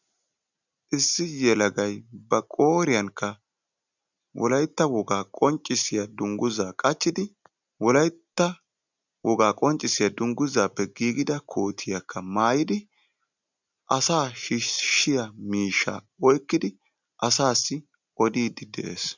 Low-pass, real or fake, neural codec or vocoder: 7.2 kHz; real; none